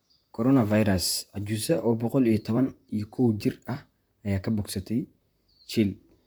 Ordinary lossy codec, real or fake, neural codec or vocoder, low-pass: none; fake; vocoder, 44.1 kHz, 128 mel bands, Pupu-Vocoder; none